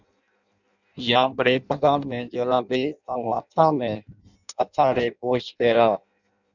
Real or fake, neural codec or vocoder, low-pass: fake; codec, 16 kHz in and 24 kHz out, 0.6 kbps, FireRedTTS-2 codec; 7.2 kHz